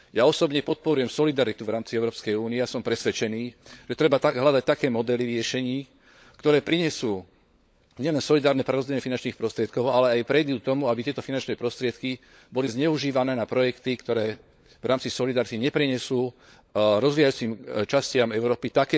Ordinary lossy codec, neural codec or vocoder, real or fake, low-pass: none; codec, 16 kHz, 16 kbps, FunCodec, trained on LibriTTS, 50 frames a second; fake; none